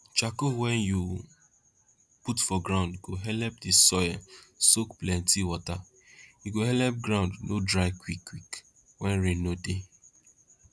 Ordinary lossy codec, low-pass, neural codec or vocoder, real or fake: none; none; none; real